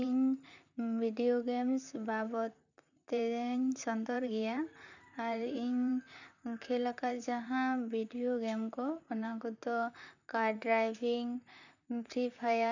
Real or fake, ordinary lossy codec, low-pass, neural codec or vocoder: fake; none; 7.2 kHz; vocoder, 44.1 kHz, 128 mel bands, Pupu-Vocoder